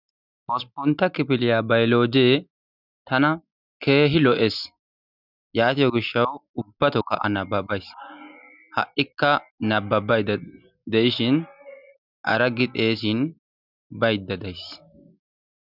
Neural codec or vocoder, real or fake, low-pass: none; real; 5.4 kHz